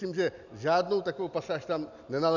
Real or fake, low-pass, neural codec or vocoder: real; 7.2 kHz; none